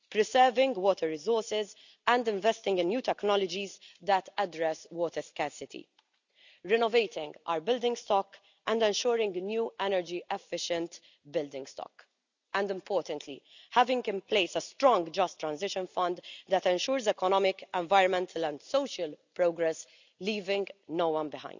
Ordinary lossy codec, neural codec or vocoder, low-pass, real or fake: none; none; 7.2 kHz; real